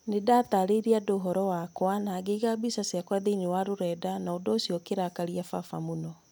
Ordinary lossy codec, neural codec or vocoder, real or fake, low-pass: none; none; real; none